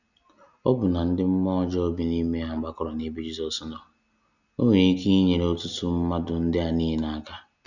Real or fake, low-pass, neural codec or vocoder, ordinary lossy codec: real; 7.2 kHz; none; none